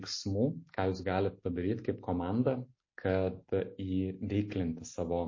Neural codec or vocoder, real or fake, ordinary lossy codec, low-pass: none; real; MP3, 32 kbps; 7.2 kHz